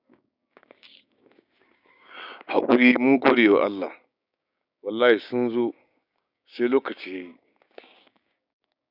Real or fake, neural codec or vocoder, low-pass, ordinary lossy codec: fake; codec, 16 kHz, 6 kbps, DAC; 5.4 kHz; none